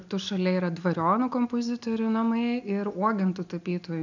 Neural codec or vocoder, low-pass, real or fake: none; 7.2 kHz; real